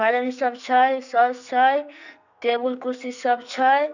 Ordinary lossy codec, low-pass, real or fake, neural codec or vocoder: none; 7.2 kHz; fake; codec, 44.1 kHz, 2.6 kbps, SNAC